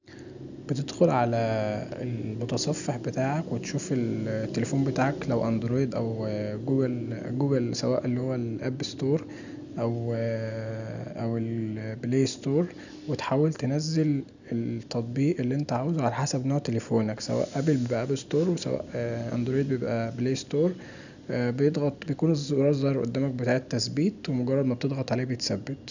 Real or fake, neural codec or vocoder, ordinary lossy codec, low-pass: real; none; none; 7.2 kHz